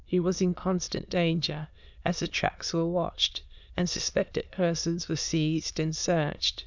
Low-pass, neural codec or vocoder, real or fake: 7.2 kHz; autoencoder, 22.05 kHz, a latent of 192 numbers a frame, VITS, trained on many speakers; fake